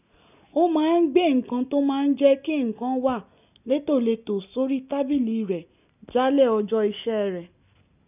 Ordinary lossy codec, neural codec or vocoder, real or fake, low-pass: none; none; real; 3.6 kHz